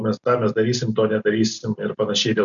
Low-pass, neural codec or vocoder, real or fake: 7.2 kHz; none; real